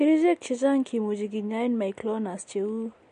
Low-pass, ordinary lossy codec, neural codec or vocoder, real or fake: 10.8 kHz; MP3, 48 kbps; none; real